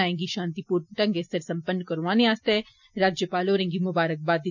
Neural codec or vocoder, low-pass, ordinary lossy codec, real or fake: none; 7.2 kHz; none; real